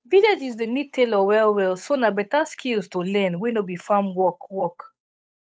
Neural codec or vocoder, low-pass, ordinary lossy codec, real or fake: codec, 16 kHz, 8 kbps, FunCodec, trained on Chinese and English, 25 frames a second; none; none; fake